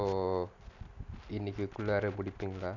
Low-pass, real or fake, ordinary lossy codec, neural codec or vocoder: 7.2 kHz; real; none; none